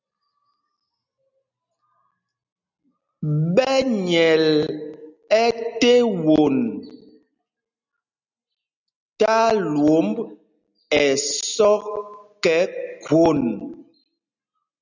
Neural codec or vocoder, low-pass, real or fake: none; 7.2 kHz; real